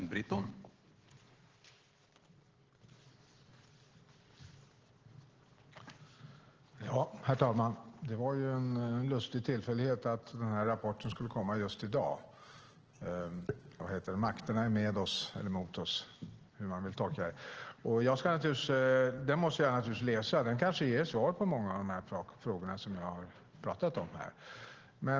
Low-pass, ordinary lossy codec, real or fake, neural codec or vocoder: 7.2 kHz; Opus, 16 kbps; real; none